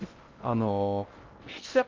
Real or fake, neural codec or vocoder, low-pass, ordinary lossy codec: fake; codec, 16 kHz in and 24 kHz out, 0.6 kbps, FocalCodec, streaming, 2048 codes; 7.2 kHz; Opus, 24 kbps